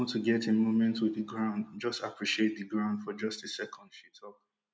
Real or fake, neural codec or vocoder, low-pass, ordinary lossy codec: real; none; none; none